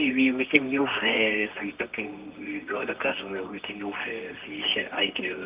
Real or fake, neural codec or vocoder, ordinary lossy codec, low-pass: fake; codec, 24 kHz, 0.9 kbps, WavTokenizer, medium music audio release; Opus, 24 kbps; 3.6 kHz